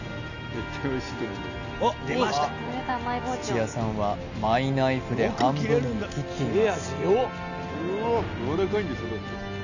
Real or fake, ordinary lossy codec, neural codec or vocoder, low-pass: real; none; none; 7.2 kHz